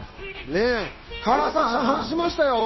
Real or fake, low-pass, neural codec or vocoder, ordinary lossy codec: fake; 7.2 kHz; codec, 16 kHz, 0.9 kbps, LongCat-Audio-Codec; MP3, 24 kbps